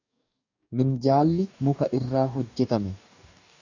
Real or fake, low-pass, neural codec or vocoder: fake; 7.2 kHz; codec, 44.1 kHz, 2.6 kbps, DAC